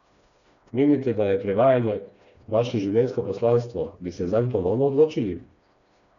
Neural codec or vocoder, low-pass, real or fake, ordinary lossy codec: codec, 16 kHz, 2 kbps, FreqCodec, smaller model; 7.2 kHz; fake; none